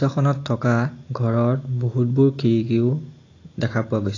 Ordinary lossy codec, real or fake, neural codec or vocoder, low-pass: AAC, 32 kbps; real; none; 7.2 kHz